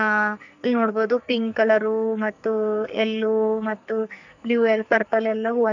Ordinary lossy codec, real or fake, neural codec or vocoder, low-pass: none; fake; codec, 44.1 kHz, 2.6 kbps, SNAC; 7.2 kHz